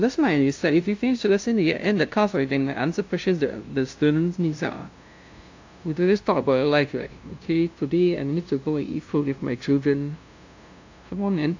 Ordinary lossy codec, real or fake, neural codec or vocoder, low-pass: AAC, 48 kbps; fake; codec, 16 kHz, 0.5 kbps, FunCodec, trained on LibriTTS, 25 frames a second; 7.2 kHz